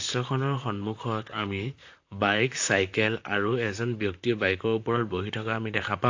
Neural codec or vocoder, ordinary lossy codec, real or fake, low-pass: vocoder, 44.1 kHz, 128 mel bands, Pupu-Vocoder; AAC, 48 kbps; fake; 7.2 kHz